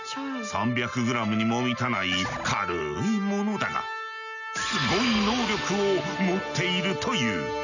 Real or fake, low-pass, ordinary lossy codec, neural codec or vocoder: real; 7.2 kHz; none; none